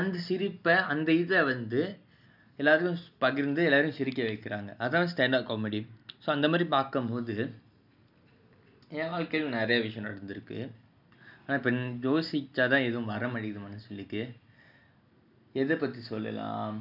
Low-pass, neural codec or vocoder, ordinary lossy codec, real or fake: 5.4 kHz; none; none; real